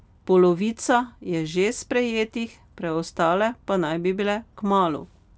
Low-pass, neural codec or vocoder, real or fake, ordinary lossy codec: none; none; real; none